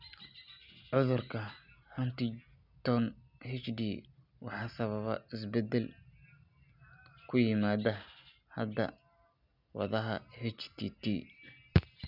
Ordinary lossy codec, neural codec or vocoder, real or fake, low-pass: none; none; real; 5.4 kHz